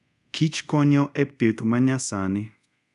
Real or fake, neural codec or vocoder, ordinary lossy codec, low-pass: fake; codec, 24 kHz, 0.5 kbps, DualCodec; none; 10.8 kHz